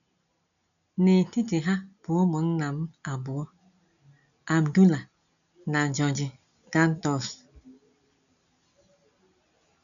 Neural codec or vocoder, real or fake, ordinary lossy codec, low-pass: none; real; none; 7.2 kHz